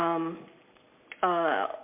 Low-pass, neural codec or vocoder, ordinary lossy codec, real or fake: 3.6 kHz; none; MP3, 32 kbps; real